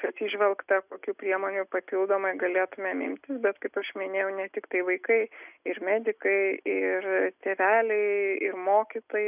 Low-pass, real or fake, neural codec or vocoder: 3.6 kHz; real; none